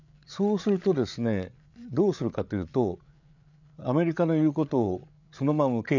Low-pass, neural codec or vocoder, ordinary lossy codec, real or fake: 7.2 kHz; codec, 16 kHz, 8 kbps, FreqCodec, larger model; none; fake